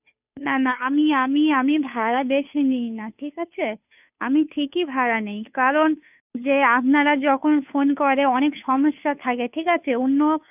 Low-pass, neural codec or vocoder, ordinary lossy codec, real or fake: 3.6 kHz; codec, 16 kHz, 2 kbps, FunCodec, trained on Chinese and English, 25 frames a second; none; fake